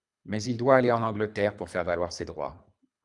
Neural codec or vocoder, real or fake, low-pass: codec, 24 kHz, 3 kbps, HILCodec; fake; 10.8 kHz